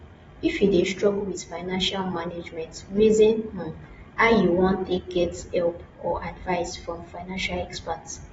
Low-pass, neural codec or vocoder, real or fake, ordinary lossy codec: 19.8 kHz; none; real; AAC, 24 kbps